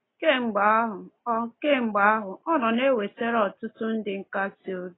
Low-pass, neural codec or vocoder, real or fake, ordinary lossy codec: 7.2 kHz; none; real; AAC, 16 kbps